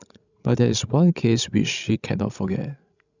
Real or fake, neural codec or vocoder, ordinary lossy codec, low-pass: fake; codec, 16 kHz, 16 kbps, FreqCodec, larger model; none; 7.2 kHz